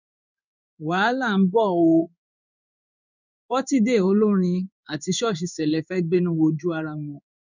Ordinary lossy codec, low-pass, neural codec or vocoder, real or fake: none; 7.2 kHz; codec, 16 kHz in and 24 kHz out, 1 kbps, XY-Tokenizer; fake